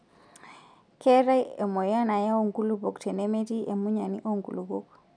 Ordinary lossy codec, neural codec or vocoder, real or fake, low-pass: none; none; real; 9.9 kHz